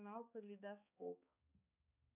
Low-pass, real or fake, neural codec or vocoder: 3.6 kHz; fake; codec, 16 kHz, 4 kbps, X-Codec, HuBERT features, trained on balanced general audio